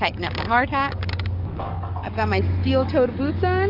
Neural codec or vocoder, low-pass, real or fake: codec, 44.1 kHz, 7.8 kbps, DAC; 5.4 kHz; fake